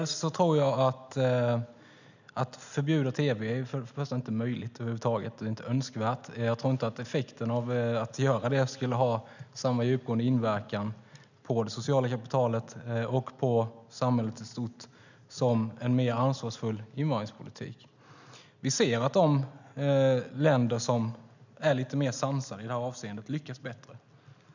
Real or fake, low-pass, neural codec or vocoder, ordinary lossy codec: real; 7.2 kHz; none; none